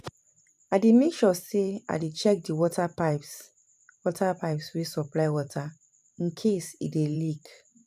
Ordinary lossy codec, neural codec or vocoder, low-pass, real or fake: none; vocoder, 44.1 kHz, 128 mel bands every 512 samples, BigVGAN v2; 14.4 kHz; fake